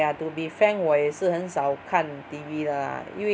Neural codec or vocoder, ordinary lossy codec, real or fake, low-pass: none; none; real; none